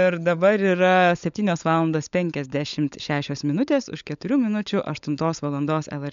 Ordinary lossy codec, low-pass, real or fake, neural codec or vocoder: MP3, 64 kbps; 7.2 kHz; fake; codec, 16 kHz, 16 kbps, FunCodec, trained on LibriTTS, 50 frames a second